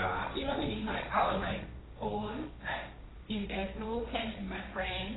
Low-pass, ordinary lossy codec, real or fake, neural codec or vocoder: 7.2 kHz; AAC, 16 kbps; fake; codec, 16 kHz, 1.1 kbps, Voila-Tokenizer